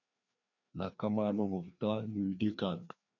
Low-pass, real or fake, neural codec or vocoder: 7.2 kHz; fake; codec, 16 kHz, 2 kbps, FreqCodec, larger model